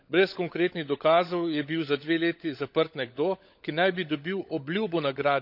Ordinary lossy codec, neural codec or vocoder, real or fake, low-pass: none; codec, 16 kHz, 16 kbps, FunCodec, trained on LibriTTS, 50 frames a second; fake; 5.4 kHz